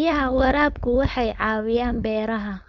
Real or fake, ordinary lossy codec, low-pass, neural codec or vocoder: fake; none; 7.2 kHz; codec, 16 kHz, 4.8 kbps, FACodec